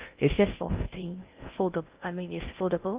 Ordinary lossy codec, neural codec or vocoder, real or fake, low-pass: Opus, 32 kbps; codec, 16 kHz in and 24 kHz out, 0.6 kbps, FocalCodec, streaming, 4096 codes; fake; 3.6 kHz